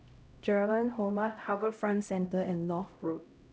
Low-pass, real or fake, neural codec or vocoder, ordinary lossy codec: none; fake; codec, 16 kHz, 0.5 kbps, X-Codec, HuBERT features, trained on LibriSpeech; none